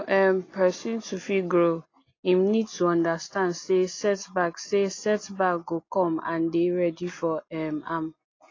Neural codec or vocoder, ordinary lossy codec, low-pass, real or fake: none; AAC, 32 kbps; 7.2 kHz; real